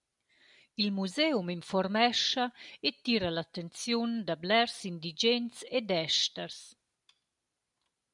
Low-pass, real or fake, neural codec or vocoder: 10.8 kHz; real; none